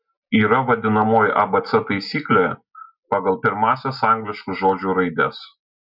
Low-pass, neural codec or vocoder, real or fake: 5.4 kHz; none; real